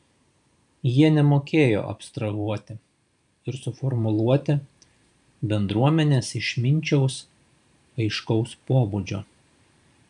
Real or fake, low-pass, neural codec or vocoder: fake; 10.8 kHz; vocoder, 44.1 kHz, 128 mel bands every 512 samples, BigVGAN v2